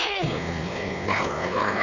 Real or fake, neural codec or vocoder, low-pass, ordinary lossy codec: fake; codec, 16 kHz, 2 kbps, X-Codec, WavLM features, trained on Multilingual LibriSpeech; 7.2 kHz; none